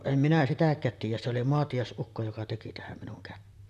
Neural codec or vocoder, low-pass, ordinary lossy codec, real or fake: vocoder, 44.1 kHz, 128 mel bands, Pupu-Vocoder; 14.4 kHz; none; fake